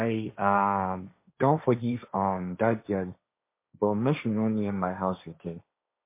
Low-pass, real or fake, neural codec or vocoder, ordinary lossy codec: 3.6 kHz; fake; codec, 16 kHz, 1.1 kbps, Voila-Tokenizer; MP3, 24 kbps